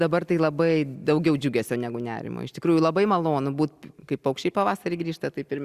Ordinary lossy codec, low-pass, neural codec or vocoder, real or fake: Opus, 64 kbps; 14.4 kHz; none; real